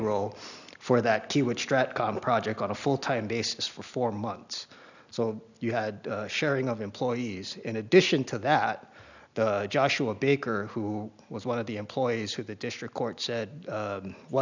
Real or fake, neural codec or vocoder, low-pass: real; none; 7.2 kHz